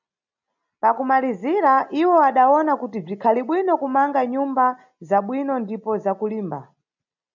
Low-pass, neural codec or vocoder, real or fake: 7.2 kHz; none; real